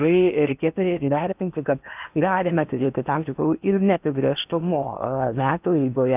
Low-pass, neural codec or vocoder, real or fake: 3.6 kHz; codec, 16 kHz in and 24 kHz out, 0.8 kbps, FocalCodec, streaming, 65536 codes; fake